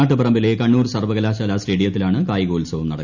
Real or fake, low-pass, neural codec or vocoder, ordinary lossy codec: real; 7.2 kHz; none; none